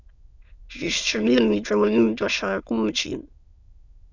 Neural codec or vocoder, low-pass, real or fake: autoencoder, 22.05 kHz, a latent of 192 numbers a frame, VITS, trained on many speakers; 7.2 kHz; fake